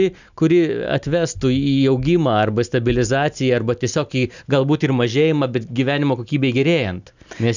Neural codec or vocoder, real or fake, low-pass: none; real; 7.2 kHz